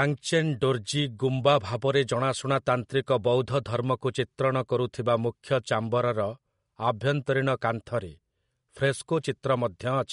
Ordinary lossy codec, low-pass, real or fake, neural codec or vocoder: MP3, 48 kbps; 10.8 kHz; real; none